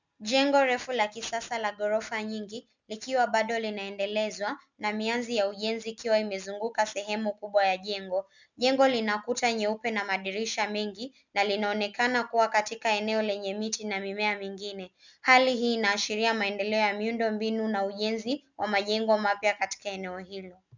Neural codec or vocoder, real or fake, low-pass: none; real; 7.2 kHz